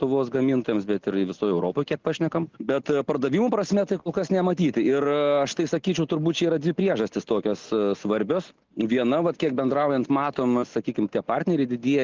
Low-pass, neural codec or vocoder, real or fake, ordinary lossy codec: 7.2 kHz; none; real; Opus, 24 kbps